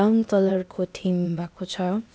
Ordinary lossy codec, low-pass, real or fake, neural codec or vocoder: none; none; fake; codec, 16 kHz, 0.8 kbps, ZipCodec